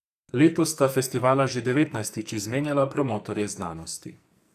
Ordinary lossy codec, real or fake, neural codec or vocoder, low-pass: none; fake; codec, 44.1 kHz, 2.6 kbps, SNAC; 14.4 kHz